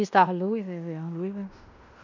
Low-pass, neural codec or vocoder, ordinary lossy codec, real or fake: 7.2 kHz; codec, 16 kHz in and 24 kHz out, 0.9 kbps, LongCat-Audio-Codec, fine tuned four codebook decoder; none; fake